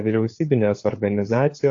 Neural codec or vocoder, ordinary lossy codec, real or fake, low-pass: codec, 16 kHz, 4 kbps, FunCodec, trained on LibriTTS, 50 frames a second; AAC, 48 kbps; fake; 7.2 kHz